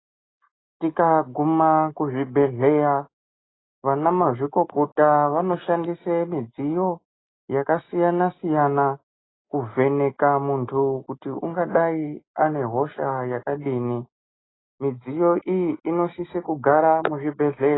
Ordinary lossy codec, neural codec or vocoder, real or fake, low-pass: AAC, 16 kbps; none; real; 7.2 kHz